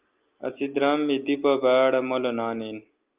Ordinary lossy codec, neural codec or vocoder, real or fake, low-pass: Opus, 32 kbps; none; real; 3.6 kHz